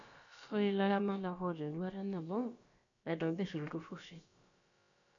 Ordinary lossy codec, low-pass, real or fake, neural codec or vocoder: none; 7.2 kHz; fake; codec, 16 kHz, about 1 kbps, DyCAST, with the encoder's durations